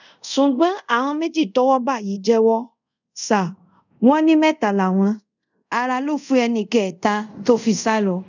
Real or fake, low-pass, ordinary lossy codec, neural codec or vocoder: fake; 7.2 kHz; none; codec, 24 kHz, 0.5 kbps, DualCodec